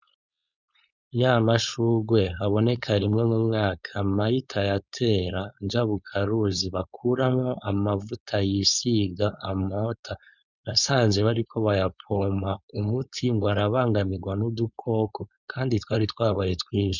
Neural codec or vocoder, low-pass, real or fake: codec, 16 kHz, 4.8 kbps, FACodec; 7.2 kHz; fake